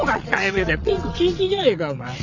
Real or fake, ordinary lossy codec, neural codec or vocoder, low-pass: fake; none; vocoder, 22.05 kHz, 80 mel bands, WaveNeXt; 7.2 kHz